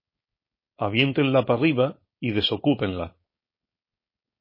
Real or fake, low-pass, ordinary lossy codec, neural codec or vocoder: fake; 5.4 kHz; MP3, 24 kbps; codec, 16 kHz, 4.8 kbps, FACodec